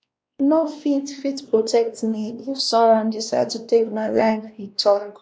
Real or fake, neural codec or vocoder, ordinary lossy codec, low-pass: fake; codec, 16 kHz, 1 kbps, X-Codec, WavLM features, trained on Multilingual LibriSpeech; none; none